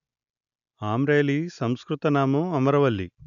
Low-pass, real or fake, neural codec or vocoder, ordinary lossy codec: 7.2 kHz; real; none; none